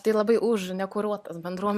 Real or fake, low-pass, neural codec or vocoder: real; 14.4 kHz; none